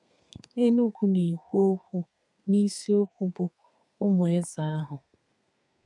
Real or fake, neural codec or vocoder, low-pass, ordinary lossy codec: fake; codec, 32 kHz, 1.9 kbps, SNAC; 10.8 kHz; none